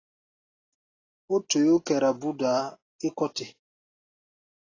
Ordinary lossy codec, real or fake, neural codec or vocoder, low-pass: AAC, 32 kbps; real; none; 7.2 kHz